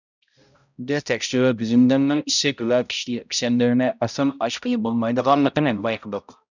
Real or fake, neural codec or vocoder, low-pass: fake; codec, 16 kHz, 0.5 kbps, X-Codec, HuBERT features, trained on balanced general audio; 7.2 kHz